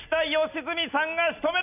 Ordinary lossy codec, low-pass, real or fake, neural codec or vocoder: none; 3.6 kHz; real; none